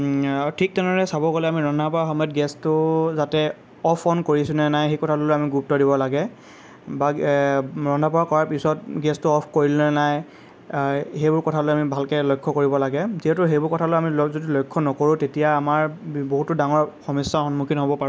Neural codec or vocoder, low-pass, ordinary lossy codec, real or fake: none; none; none; real